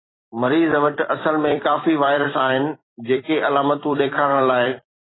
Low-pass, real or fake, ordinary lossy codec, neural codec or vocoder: 7.2 kHz; fake; AAC, 16 kbps; vocoder, 44.1 kHz, 128 mel bands every 256 samples, BigVGAN v2